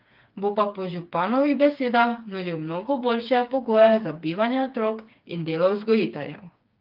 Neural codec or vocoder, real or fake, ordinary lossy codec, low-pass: codec, 16 kHz, 4 kbps, FreqCodec, smaller model; fake; Opus, 24 kbps; 5.4 kHz